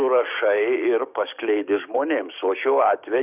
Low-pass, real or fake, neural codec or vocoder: 3.6 kHz; fake; codec, 16 kHz, 6 kbps, DAC